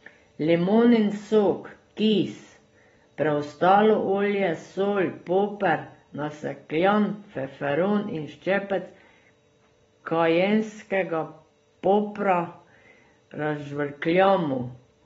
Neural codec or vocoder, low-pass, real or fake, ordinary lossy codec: none; 10.8 kHz; real; AAC, 24 kbps